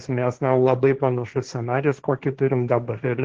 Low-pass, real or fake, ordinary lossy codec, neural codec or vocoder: 7.2 kHz; fake; Opus, 16 kbps; codec, 16 kHz, 1.1 kbps, Voila-Tokenizer